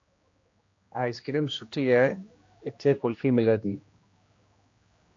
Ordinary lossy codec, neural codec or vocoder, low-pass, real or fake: AAC, 48 kbps; codec, 16 kHz, 1 kbps, X-Codec, HuBERT features, trained on general audio; 7.2 kHz; fake